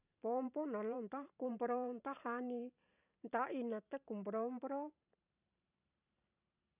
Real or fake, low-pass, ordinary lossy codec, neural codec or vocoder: fake; 3.6 kHz; none; vocoder, 44.1 kHz, 128 mel bands every 512 samples, BigVGAN v2